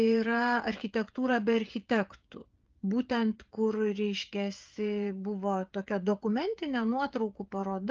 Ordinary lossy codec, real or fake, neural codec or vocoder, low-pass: Opus, 24 kbps; fake; codec, 16 kHz, 16 kbps, FreqCodec, smaller model; 7.2 kHz